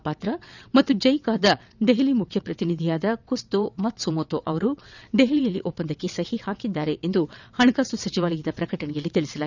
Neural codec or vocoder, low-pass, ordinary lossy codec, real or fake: vocoder, 22.05 kHz, 80 mel bands, WaveNeXt; 7.2 kHz; none; fake